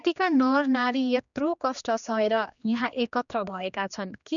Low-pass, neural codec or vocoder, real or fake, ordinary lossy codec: 7.2 kHz; codec, 16 kHz, 2 kbps, X-Codec, HuBERT features, trained on general audio; fake; none